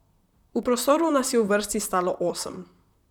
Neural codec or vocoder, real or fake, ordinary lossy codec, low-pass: vocoder, 44.1 kHz, 128 mel bands, Pupu-Vocoder; fake; none; 19.8 kHz